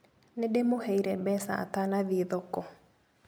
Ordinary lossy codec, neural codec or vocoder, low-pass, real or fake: none; none; none; real